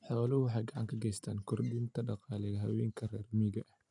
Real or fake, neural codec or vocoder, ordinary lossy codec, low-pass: real; none; none; 10.8 kHz